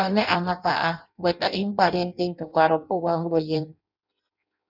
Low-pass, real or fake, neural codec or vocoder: 5.4 kHz; fake; codec, 16 kHz in and 24 kHz out, 0.6 kbps, FireRedTTS-2 codec